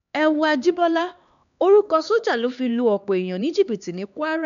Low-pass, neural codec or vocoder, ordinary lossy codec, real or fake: 7.2 kHz; codec, 16 kHz, 2 kbps, X-Codec, HuBERT features, trained on LibriSpeech; none; fake